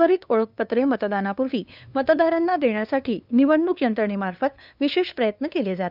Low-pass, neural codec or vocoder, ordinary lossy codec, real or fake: 5.4 kHz; codec, 16 kHz, 2 kbps, FunCodec, trained on LibriTTS, 25 frames a second; none; fake